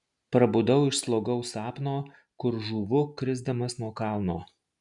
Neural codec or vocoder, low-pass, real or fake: none; 10.8 kHz; real